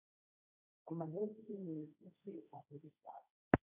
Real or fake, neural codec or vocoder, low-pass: fake; codec, 16 kHz, 1.1 kbps, Voila-Tokenizer; 3.6 kHz